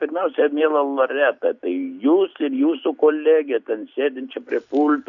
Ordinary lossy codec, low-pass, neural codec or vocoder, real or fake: Opus, 64 kbps; 7.2 kHz; none; real